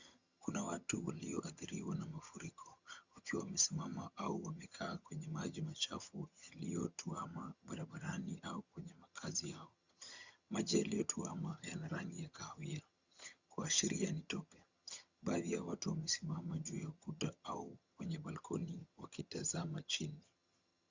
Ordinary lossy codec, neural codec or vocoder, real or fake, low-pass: Opus, 64 kbps; vocoder, 22.05 kHz, 80 mel bands, HiFi-GAN; fake; 7.2 kHz